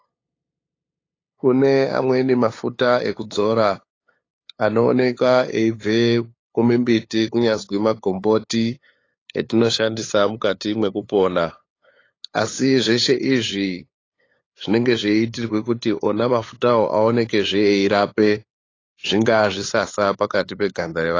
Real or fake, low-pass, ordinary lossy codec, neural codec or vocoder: fake; 7.2 kHz; AAC, 32 kbps; codec, 16 kHz, 8 kbps, FunCodec, trained on LibriTTS, 25 frames a second